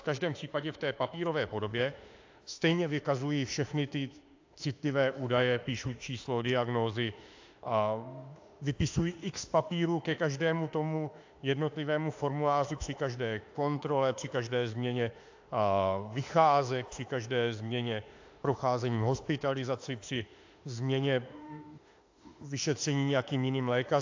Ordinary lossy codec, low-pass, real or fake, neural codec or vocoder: AAC, 48 kbps; 7.2 kHz; fake; autoencoder, 48 kHz, 32 numbers a frame, DAC-VAE, trained on Japanese speech